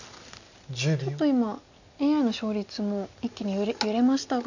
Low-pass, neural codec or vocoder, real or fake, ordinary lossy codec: 7.2 kHz; none; real; none